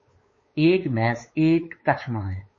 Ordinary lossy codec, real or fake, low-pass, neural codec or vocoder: MP3, 32 kbps; fake; 7.2 kHz; codec, 16 kHz, 4 kbps, X-Codec, WavLM features, trained on Multilingual LibriSpeech